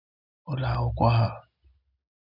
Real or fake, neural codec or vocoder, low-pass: real; none; 5.4 kHz